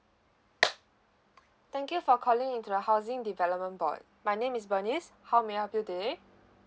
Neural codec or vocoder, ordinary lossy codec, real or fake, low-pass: none; none; real; none